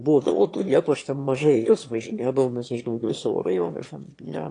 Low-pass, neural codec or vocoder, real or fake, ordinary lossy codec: 9.9 kHz; autoencoder, 22.05 kHz, a latent of 192 numbers a frame, VITS, trained on one speaker; fake; AAC, 48 kbps